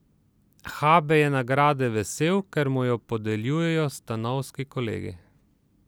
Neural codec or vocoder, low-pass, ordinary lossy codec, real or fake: none; none; none; real